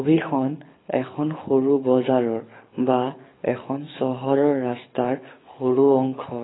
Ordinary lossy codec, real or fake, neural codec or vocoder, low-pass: AAC, 16 kbps; fake; codec, 24 kHz, 6 kbps, HILCodec; 7.2 kHz